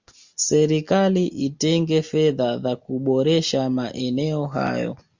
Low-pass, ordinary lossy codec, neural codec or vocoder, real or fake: 7.2 kHz; Opus, 64 kbps; none; real